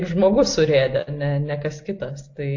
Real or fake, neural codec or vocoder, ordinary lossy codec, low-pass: real; none; AAC, 48 kbps; 7.2 kHz